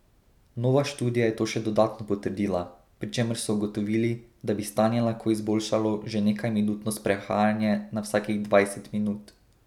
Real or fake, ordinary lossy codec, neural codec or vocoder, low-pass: real; none; none; 19.8 kHz